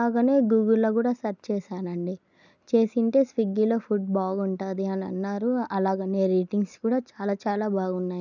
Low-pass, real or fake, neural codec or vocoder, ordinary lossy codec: 7.2 kHz; real; none; none